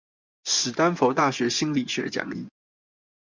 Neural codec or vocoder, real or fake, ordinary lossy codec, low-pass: vocoder, 24 kHz, 100 mel bands, Vocos; fake; MP3, 64 kbps; 7.2 kHz